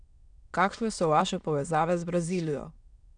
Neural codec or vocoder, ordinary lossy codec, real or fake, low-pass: autoencoder, 22.05 kHz, a latent of 192 numbers a frame, VITS, trained on many speakers; none; fake; 9.9 kHz